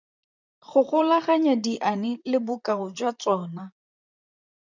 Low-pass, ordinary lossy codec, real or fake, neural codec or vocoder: 7.2 kHz; MP3, 64 kbps; fake; vocoder, 44.1 kHz, 128 mel bands, Pupu-Vocoder